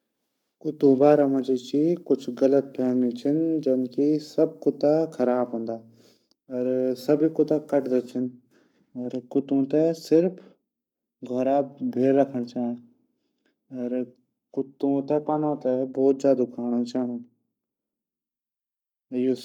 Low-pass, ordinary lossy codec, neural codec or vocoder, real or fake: 19.8 kHz; none; codec, 44.1 kHz, 7.8 kbps, Pupu-Codec; fake